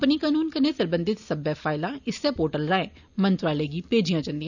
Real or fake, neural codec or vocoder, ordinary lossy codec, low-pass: real; none; none; 7.2 kHz